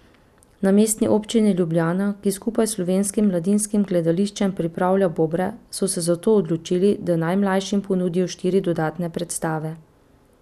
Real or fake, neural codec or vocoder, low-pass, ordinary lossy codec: real; none; 14.4 kHz; none